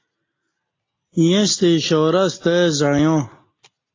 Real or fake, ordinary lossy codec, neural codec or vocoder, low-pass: real; AAC, 32 kbps; none; 7.2 kHz